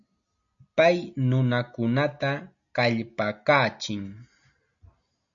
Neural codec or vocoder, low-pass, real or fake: none; 7.2 kHz; real